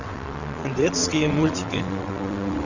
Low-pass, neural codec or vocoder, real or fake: 7.2 kHz; vocoder, 22.05 kHz, 80 mel bands, WaveNeXt; fake